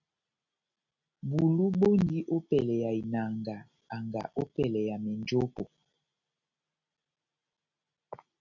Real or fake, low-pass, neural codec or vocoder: real; 7.2 kHz; none